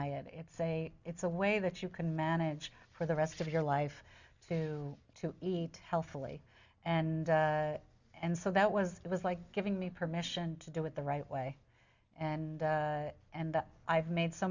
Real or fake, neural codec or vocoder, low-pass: real; none; 7.2 kHz